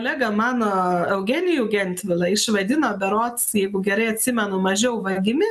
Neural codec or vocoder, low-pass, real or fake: none; 14.4 kHz; real